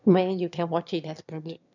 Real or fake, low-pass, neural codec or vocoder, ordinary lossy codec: fake; 7.2 kHz; autoencoder, 22.05 kHz, a latent of 192 numbers a frame, VITS, trained on one speaker; none